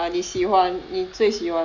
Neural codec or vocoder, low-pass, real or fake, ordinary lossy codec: none; 7.2 kHz; real; none